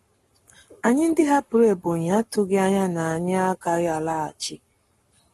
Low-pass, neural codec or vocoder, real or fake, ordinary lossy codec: 19.8 kHz; codec, 44.1 kHz, 7.8 kbps, Pupu-Codec; fake; AAC, 32 kbps